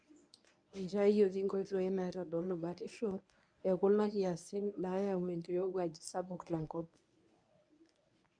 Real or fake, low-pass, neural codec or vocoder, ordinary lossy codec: fake; 9.9 kHz; codec, 24 kHz, 0.9 kbps, WavTokenizer, medium speech release version 1; none